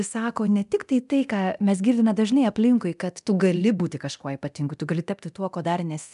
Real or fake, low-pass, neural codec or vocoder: fake; 10.8 kHz; codec, 24 kHz, 0.9 kbps, DualCodec